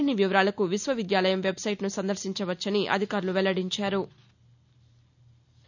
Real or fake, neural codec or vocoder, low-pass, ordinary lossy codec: real; none; 7.2 kHz; none